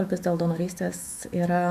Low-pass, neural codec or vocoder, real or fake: 14.4 kHz; autoencoder, 48 kHz, 128 numbers a frame, DAC-VAE, trained on Japanese speech; fake